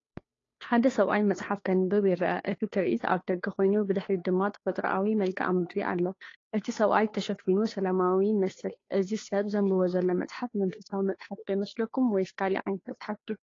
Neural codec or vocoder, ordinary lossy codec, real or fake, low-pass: codec, 16 kHz, 2 kbps, FunCodec, trained on Chinese and English, 25 frames a second; AAC, 32 kbps; fake; 7.2 kHz